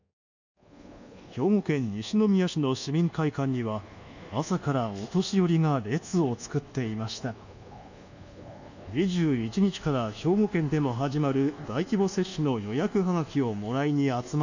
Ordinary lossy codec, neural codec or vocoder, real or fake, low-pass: none; codec, 24 kHz, 1.2 kbps, DualCodec; fake; 7.2 kHz